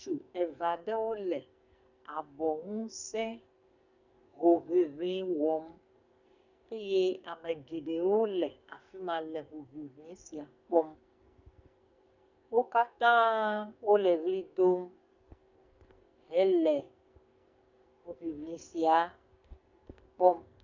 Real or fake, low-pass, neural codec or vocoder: fake; 7.2 kHz; codec, 44.1 kHz, 2.6 kbps, SNAC